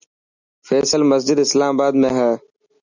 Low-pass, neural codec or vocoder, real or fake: 7.2 kHz; none; real